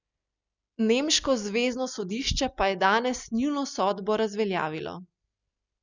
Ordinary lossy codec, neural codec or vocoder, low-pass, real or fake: none; none; 7.2 kHz; real